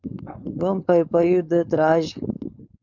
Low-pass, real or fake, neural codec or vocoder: 7.2 kHz; fake; codec, 16 kHz, 4.8 kbps, FACodec